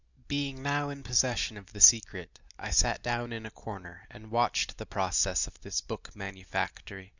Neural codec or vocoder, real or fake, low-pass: none; real; 7.2 kHz